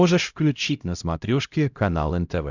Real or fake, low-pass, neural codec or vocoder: fake; 7.2 kHz; codec, 16 kHz, 0.5 kbps, X-Codec, HuBERT features, trained on LibriSpeech